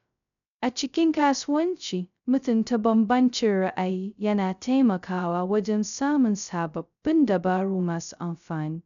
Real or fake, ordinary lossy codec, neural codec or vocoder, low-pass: fake; none; codec, 16 kHz, 0.2 kbps, FocalCodec; 7.2 kHz